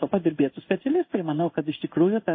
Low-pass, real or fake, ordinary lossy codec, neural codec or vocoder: 7.2 kHz; fake; MP3, 24 kbps; codec, 16 kHz in and 24 kHz out, 1 kbps, XY-Tokenizer